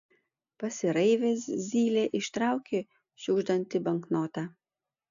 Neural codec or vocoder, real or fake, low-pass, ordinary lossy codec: none; real; 7.2 kHz; AAC, 64 kbps